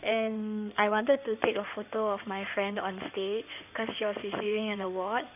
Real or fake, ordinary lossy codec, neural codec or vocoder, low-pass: fake; none; codec, 16 kHz in and 24 kHz out, 2.2 kbps, FireRedTTS-2 codec; 3.6 kHz